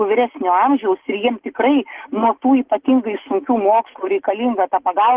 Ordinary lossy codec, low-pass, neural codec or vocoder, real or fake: Opus, 16 kbps; 3.6 kHz; none; real